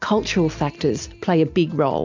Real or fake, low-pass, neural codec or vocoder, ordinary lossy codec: real; 7.2 kHz; none; MP3, 64 kbps